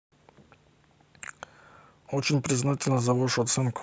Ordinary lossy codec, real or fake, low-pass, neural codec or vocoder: none; real; none; none